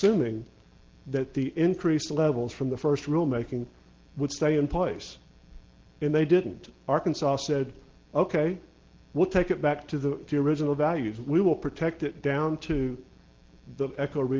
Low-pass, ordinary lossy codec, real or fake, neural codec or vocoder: 7.2 kHz; Opus, 16 kbps; real; none